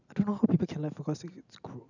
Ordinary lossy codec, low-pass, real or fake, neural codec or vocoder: none; 7.2 kHz; real; none